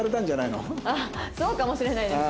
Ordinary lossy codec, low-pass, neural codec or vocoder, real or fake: none; none; none; real